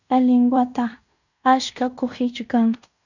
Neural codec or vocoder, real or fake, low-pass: codec, 16 kHz in and 24 kHz out, 0.9 kbps, LongCat-Audio-Codec, fine tuned four codebook decoder; fake; 7.2 kHz